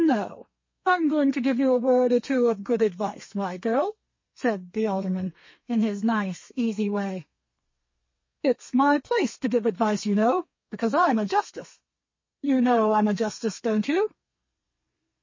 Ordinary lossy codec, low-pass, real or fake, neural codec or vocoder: MP3, 32 kbps; 7.2 kHz; fake; codec, 32 kHz, 1.9 kbps, SNAC